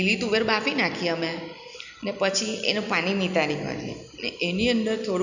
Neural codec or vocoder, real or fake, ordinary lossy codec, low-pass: none; real; none; 7.2 kHz